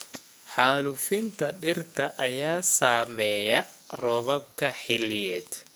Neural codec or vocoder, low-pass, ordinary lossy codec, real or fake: codec, 44.1 kHz, 2.6 kbps, SNAC; none; none; fake